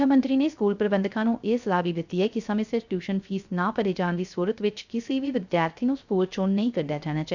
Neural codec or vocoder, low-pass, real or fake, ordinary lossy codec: codec, 16 kHz, 0.3 kbps, FocalCodec; 7.2 kHz; fake; none